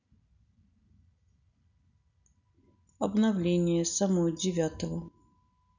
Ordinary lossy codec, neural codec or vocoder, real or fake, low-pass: none; none; real; 7.2 kHz